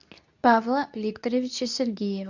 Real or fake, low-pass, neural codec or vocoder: fake; 7.2 kHz; codec, 24 kHz, 0.9 kbps, WavTokenizer, medium speech release version 2